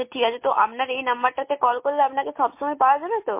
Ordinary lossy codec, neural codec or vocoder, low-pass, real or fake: MP3, 24 kbps; none; 3.6 kHz; real